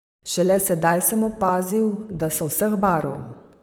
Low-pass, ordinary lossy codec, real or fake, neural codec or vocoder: none; none; fake; vocoder, 44.1 kHz, 128 mel bands, Pupu-Vocoder